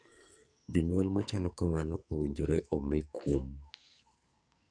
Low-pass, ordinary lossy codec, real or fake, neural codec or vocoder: 9.9 kHz; none; fake; codec, 44.1 kHz, 2.6 kbps, SNAC